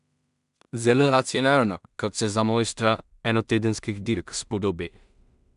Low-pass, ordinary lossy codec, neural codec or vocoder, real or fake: 10.8 kHz; none; codec, 16 kHz in and 24 kHz out, 0.4 kbps, LongCat-Audio-Codec, two codebook decoder; fake